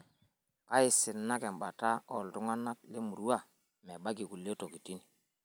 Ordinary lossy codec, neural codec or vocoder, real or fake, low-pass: none; none; real; none